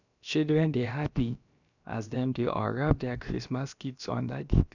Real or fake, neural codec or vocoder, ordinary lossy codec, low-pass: fake; codec, 16 kHz, about 1 kbps, DyCAST, with the encoder's durations; none; 7.2 kHz